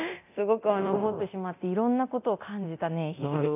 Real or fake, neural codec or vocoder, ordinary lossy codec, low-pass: fake; codec, 24 kHz, 0.9 kbps, DualCodec; none; 3.6 kHz